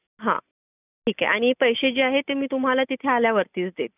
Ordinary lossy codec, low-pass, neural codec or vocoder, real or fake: none; 3.6 kHz; none; real